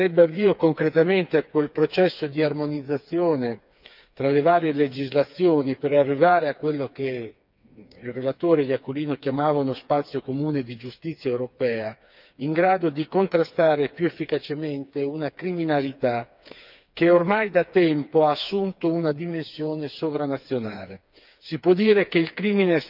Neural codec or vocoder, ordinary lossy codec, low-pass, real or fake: codec, 16 kHz, 4 kbps, FreqCodec, smaller model; none; 5.4 kHz; fake